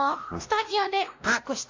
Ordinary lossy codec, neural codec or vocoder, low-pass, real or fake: AAC, 48 kbps; codec, 16 kHz, 1 kbps, X-Codec, WavLM features, trained on Multilingual LibriSpeech; 7.2 kHz; fake